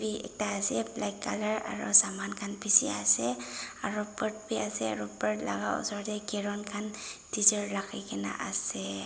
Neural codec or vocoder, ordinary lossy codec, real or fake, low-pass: none; none; real; none